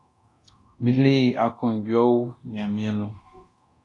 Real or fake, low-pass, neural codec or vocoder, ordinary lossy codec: fake; 10.8 kHz; codec, 24 kHz, 0.9 kbps, DualCodec; AAC, 48 kbps